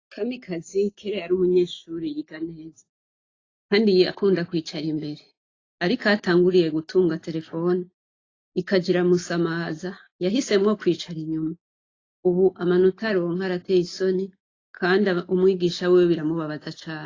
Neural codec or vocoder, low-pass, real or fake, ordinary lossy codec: none; 7.2 kHz; real; AAC, 32 kbps